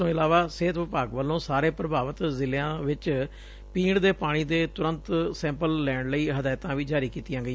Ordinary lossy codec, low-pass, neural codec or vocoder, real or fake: none; none; none; real